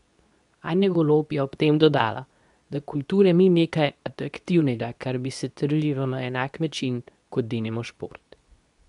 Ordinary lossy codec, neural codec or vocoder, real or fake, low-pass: none; codec, 24 kHz, 0.9 kbps, WavTokenizer, medium speech release version 2; fake; 10.8 kHz